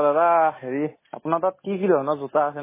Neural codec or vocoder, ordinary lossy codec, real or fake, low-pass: none; MP3, 16 kbps; real; 3.6 kHz